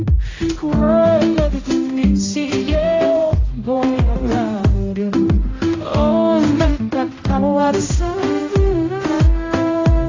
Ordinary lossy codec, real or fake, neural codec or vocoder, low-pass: AAC, 32 kbps; fake; codec, 16 kHz, 0.5 kbps, X-Codec, HuBERT features, trained on general audio; 7.2 kHz